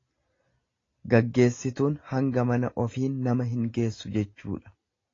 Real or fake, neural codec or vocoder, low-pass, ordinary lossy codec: real; none; 7.2 kHz; AAC, 32 kbps